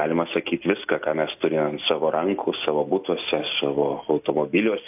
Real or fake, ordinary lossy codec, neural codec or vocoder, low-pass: real; AAC, 32 kbps; none; 3.6 kHz